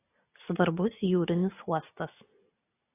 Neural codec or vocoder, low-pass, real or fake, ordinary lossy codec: none; 3.6 kHz; real; AAC, 24 kbps